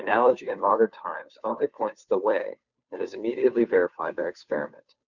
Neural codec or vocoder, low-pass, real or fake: codec, 16 kHz, 2 kbps, FunCodec, trained on Chinese and English, 25 frames a second; 7.2 kHz; fake